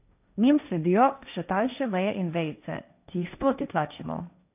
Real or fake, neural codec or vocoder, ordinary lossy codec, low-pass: fake; codec, 16 kHz, 1.1 kbps, Voila-Tokenizer; none; 3.6 kHz